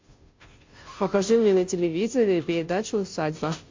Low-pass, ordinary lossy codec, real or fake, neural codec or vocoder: 7.2 kHz; MP3, 48 kbps; fake; codec, 16 kHz, 0.5 kbps, FunCodec, trained on Chinese and English, 25 frames a second